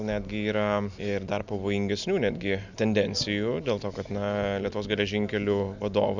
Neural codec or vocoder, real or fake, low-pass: none; real; 7.2 kHz